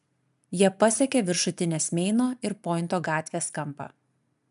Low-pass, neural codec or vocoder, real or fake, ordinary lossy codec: 10.8 kHz; none; real; MP3, 96 kbps